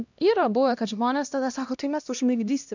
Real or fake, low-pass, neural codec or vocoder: fake; 7.2 kHz; codec, 16 kHz, 1 kbps, X-Codec, HuBERT features, trained on LibriSpeech